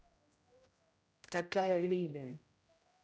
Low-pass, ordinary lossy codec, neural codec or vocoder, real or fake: none; none; codec, 16 kHz, 0.5 kbps, X-Codec, HuBERT features, trained on general audio; fake